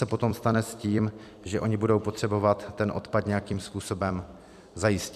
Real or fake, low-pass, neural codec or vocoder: fake; 14.4 kHz; vocoder, 44.1 kHz, 128 mel bands every 256 samples, BigVGAN v2